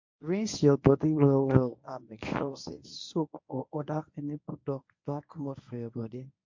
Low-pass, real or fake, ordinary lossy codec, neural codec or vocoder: 7.2 kHz; fake; MP3, 48 kbps; codec, 24 kHz, 0.9 kbps, WavTokenizer, medium speech release version 1